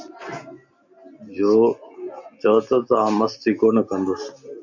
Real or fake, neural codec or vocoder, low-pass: real; none; 7.2 kHz